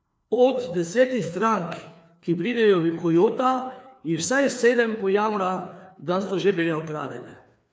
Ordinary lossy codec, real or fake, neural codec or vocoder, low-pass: none; fake; codec, 16 kHz, 2 kbps, FreqCodec, larger model; none